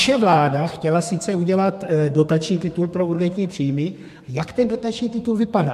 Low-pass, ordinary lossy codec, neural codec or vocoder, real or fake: 14.4 kHz; MP3, 64 kbps; codec, 32 kHz, 1.9 kbps, SNAC; fake